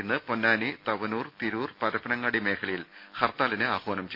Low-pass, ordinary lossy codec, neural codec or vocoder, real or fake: 5.4 kHz; MP3, 32 kbps; none; real